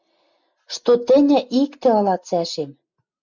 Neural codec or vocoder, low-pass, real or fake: none; 7.2 kHz; real